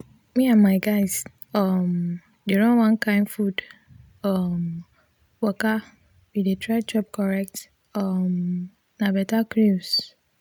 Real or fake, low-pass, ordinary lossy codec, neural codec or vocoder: real; 19.8 kHz; none; none